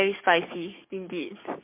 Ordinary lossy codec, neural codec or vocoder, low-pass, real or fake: MP3, 32 kbps; codec, 44.1 kHz, 7.8 kbps, DAC; 3.6 kHz; fake